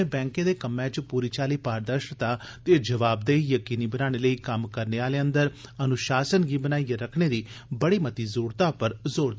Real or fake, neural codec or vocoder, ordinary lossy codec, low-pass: real; none; none; none